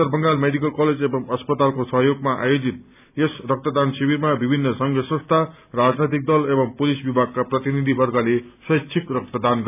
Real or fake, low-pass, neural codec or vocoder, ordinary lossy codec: real; 3.6 kHz; none; none